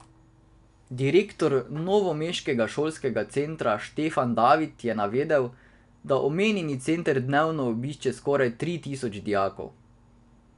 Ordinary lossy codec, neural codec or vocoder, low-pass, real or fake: none; none; 10.8 kHz; real